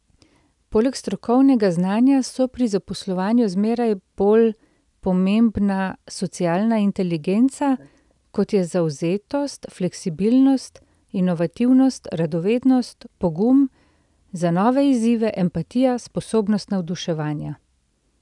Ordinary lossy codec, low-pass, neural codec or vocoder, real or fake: none; 10.8 kHz; none; real